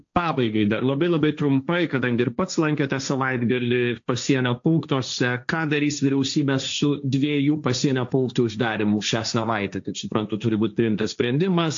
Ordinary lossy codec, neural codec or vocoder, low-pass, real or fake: AAC, 64 kbps; codec, 16 kHz, 1.1 kbps, Voila-Tokenizer; 7.2 kHz; fake